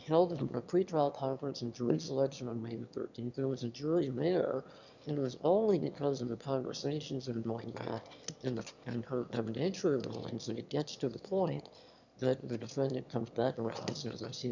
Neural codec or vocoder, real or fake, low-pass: autoencoder, 22.05 kHz, a latent of 192 numbers a frame, VITS, trained on one speaker; fake; 7.2 kHz